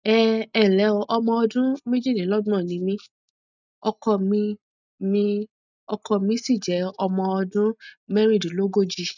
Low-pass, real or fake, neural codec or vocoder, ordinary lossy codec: 7.2 kHz; real; none; none